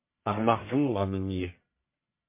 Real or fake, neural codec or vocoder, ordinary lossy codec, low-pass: fake; codec, 44.1 kHz, 1.7 kbps, Pupu-Codec; MP3, 24 kbps; 3.6 kHz